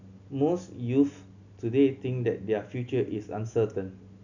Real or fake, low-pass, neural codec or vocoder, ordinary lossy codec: real; 7.2 kHz; none; none